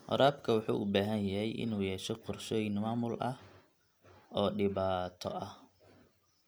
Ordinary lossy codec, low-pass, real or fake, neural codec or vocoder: none; none; real; none